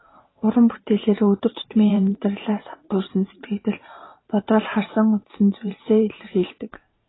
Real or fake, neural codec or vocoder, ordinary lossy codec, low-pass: fake; vocoder, 44.1 kHz, 128 mel bands every 512 samples, BigVGAN v2; AAC, 16 kbps; 7.2 kHz